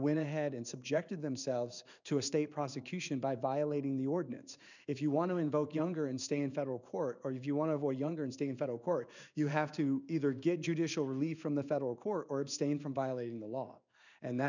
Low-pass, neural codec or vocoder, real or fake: 7.2 kHz; codec, 16 kHz in and 24 kHz out, 1 kbps, XY-Tokenizer; fake